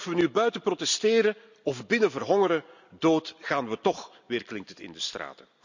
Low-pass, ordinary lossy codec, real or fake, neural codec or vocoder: 7.2 kHz; none; real; none